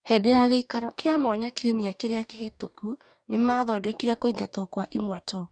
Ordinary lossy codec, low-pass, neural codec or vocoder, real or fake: none; 9.9 kHz; codec, 44.1 kHz, 2.6 kbps, DAC; fake